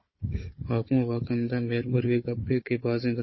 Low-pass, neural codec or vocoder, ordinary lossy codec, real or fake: 7.2 kHz; codec, 16 kHz, 4 kbps, FunCodec, trained on Chinese and English, 50 frames a second; MP3, 24 kbps; fake